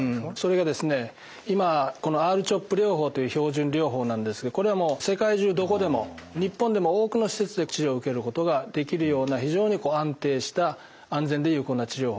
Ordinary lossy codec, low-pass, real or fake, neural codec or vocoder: none; none; real; none